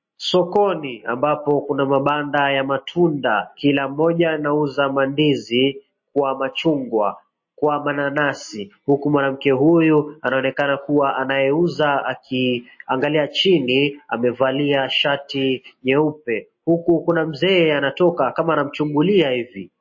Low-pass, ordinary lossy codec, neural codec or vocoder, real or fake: 7.2 kHz; MP3, 32 kbps; none; real